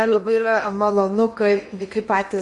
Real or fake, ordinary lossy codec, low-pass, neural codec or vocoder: fake; MP3, 48 kbps; 10.8 kHz; codec, 16 kHz in and 24 kHz out, 0.8 kbps, FocalCodec, streaming, 65536 codes